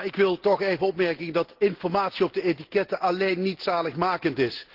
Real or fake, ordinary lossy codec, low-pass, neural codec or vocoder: real; Opus, 16 kbps; 5.4 kHz; none